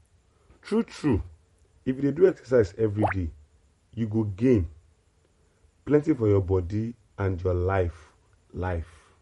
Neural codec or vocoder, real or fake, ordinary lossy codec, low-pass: vocoder, 44.1 kHz, 128 mel bands every 512 samples, BigVGAN v2; fake; MP3, 48 kbps; 19.8 kHz